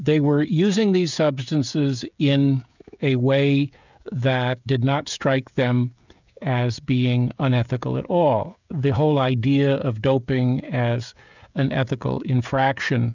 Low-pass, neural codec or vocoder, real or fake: 7.2 kHz; codec, 16 kHz, 16 kbps, FreqCodec, smaller model; fake